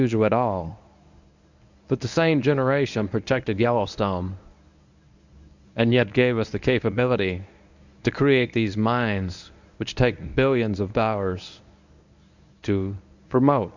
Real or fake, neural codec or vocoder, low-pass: fake; codec, 24 kHz, 0.9 kbps, WavTokenizer, medium speech release version 1; 7.2 kHz